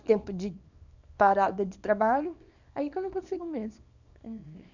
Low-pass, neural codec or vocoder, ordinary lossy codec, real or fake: 7.2 kHz; codec, 24 kHz, 0.9 kbps, WavTokenizer, small release; none; fake